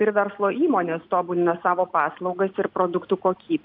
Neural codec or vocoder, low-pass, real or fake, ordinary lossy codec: none; 5.4 kHz; real; AAC, 48 kbps